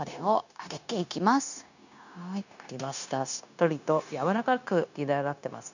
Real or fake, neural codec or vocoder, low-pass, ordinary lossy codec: fake; codec, 16 kHz, 0.9 kbps, LongCat-Audio-Codec; 7.2 kHz; none